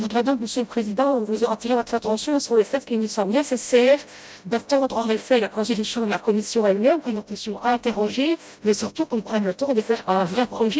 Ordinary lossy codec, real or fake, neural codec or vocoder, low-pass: none; fake; codec, 16 kHz, 0.5 kbps, FreqCodec, smaller model; none